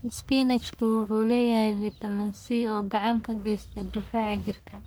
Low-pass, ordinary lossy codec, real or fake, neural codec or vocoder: none; none; fake; codec, 44.1 kHz, 1.7 kbps, Pupu-Codec